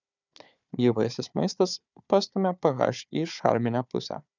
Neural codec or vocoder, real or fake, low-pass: codec, 16 kHz, 4 kbps, FunCodec, trained on Chinese and English, 50 frames a second; fake; 7.2 kHz